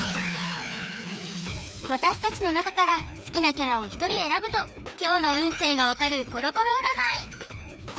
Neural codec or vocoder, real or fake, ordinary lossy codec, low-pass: codec, 16 kHz, 2 kbps, FreqCodec, larger model; fake; none; none